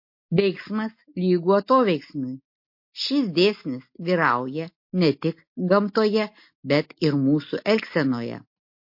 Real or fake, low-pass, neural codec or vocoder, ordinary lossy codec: real; 5.4 kHz; none; MP3, 32 kbps